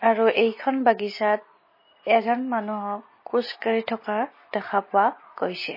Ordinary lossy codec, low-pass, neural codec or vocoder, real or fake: MP3, 24 kbps; 5.4 kHz; none; real